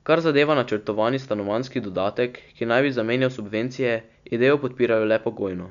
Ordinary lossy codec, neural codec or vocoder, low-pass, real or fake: none; none; 7.2 kHz; real